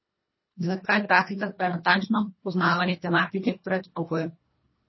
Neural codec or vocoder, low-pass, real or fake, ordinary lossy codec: codec, 24 kHz, 1.5 kbps, HILCodec; 7.2 kHz; fake; MP3, 24 kbps